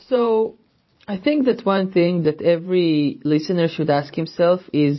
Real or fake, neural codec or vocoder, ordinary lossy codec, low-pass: fake; vocoder, 44.1 kHz, 128 mel bands every 512 samples, BigVGAN v2; MP3, 24 kbps; 7.2 kHz